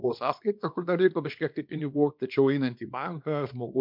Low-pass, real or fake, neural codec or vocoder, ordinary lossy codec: 5.4 kHz; fake; codec, 24 kHz, 0.9 kbps, WavTokenizer, small release; MP3, 48 kbps